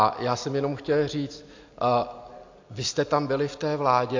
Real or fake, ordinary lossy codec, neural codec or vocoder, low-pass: real; AAC, 48 kbps; none; 7.2 kHz